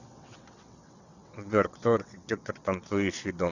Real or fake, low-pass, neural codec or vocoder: fake; 7.2 kHz; vocoder, 22.05 kHz, 80 mel bands, WaveNeXt